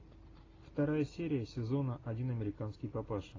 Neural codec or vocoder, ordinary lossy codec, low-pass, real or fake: none; AAC, 32 kbps; 7.2 kHz; real